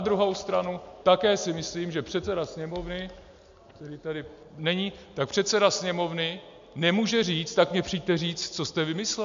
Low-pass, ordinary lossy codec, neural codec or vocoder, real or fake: 7.2 kHz; MP3, 64 kbps; none; real